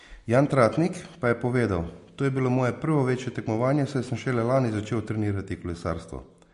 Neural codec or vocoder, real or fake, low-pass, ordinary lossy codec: none; real; 10.8 kHz; MP3, 48 kbps